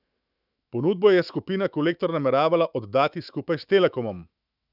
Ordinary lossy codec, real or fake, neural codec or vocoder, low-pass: none; fake; autoencoder, 48 kHz, 128 numbers a frame, DAC-VAE, trained on Japanese speech; 5.4 kHz